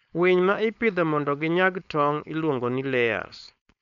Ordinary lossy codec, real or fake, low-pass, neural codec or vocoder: none; fake; 7.2 kHz; codec, 16 kHz, 4.8 kbps, FACodec